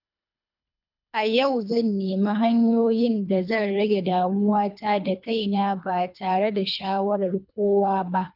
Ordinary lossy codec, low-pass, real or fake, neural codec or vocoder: none; 5.4 kHz; fake; codec, 24 kHz, 3 kbps, HILCodec